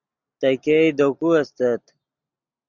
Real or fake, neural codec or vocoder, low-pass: real; none; 7.2 kHz